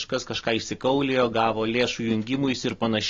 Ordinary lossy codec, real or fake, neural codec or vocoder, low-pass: AAC, 32 kbps; real; none; 7.2 kHz